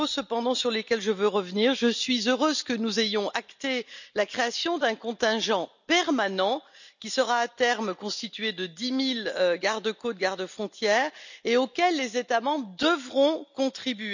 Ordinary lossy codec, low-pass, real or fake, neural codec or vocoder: none; 7.2 kHz; real; none